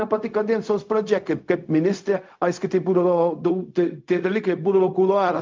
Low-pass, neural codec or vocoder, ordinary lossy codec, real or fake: 7.2 kHz; codec, 16 kHz, 0.4 kbps, LongCat-Audio-Codec; Opus, 24 kbps; fake